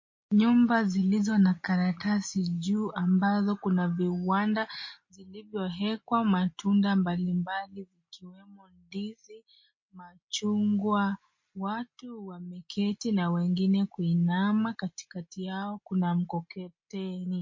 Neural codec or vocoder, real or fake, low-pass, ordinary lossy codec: none; real; 7.2 kHz; MP3, 32 kbps